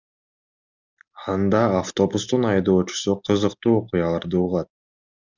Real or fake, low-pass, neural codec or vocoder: real; 7.2 kHz; none